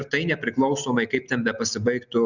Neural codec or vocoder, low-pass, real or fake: none; 7.2 kHz; real